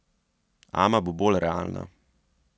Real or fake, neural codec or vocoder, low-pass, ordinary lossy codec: real; none; none; none